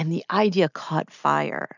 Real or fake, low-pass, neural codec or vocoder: real; 7.2 kHz; none